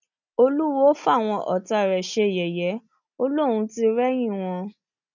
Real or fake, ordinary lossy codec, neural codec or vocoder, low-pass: real; none; none; 7.2 kHz